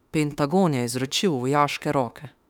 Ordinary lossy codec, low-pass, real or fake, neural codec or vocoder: none; 19.8 kHz; fake; autoencoder, 48 kHz, 32 numbers a frame, DAC-VAE, trained on Japanese speech